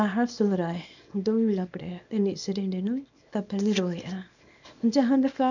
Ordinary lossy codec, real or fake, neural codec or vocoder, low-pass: none; fake; codec, 24 kHz, 0.9 kbps, WavTokenizer, small release; 7.2 kHz